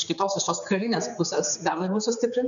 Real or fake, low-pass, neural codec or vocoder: fake; 7.2 kHz; codec, 16 kHz, 4 kbps, X-Codec, HuBERT features, trained on general audio